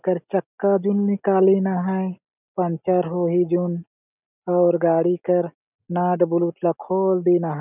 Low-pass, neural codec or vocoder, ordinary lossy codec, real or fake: 3.6 kHz; none; none; real